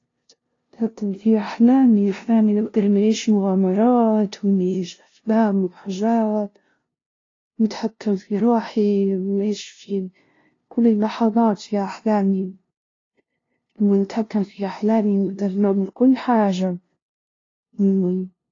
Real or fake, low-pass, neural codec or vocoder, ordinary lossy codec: fake; 7.2 kHz; codec, 16 kHz, 0.5 kbps, FunCodec, trained on LibriTTS, 25 frames a second; AAC, 32 kbps